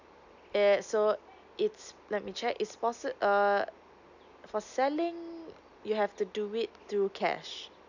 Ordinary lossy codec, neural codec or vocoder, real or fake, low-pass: none; none; real; 7.2 kHz